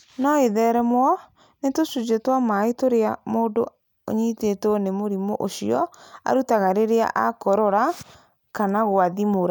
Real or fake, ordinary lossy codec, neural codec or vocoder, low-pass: real; none; none; none